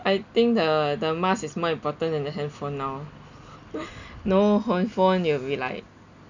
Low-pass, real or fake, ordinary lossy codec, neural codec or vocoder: 7.2 kHz; real; none; none